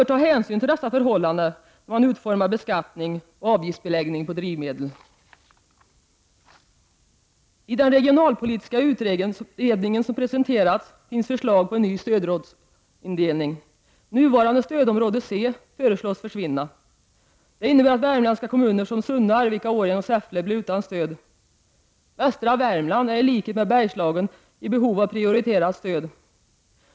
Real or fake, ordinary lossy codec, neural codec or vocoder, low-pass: real; none; none; none